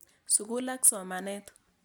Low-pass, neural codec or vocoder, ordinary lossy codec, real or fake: none; none; none; real